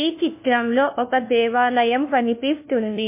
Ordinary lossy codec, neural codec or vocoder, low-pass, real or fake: MP3, 24 kbps; codec, 16 kHz, 1 kbps, FunCodec, trained on LibriTTS, 50 frames a second; 3.6 kHz; fake